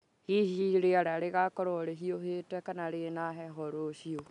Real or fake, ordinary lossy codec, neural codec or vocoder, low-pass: real; none; none; 10.8 kHz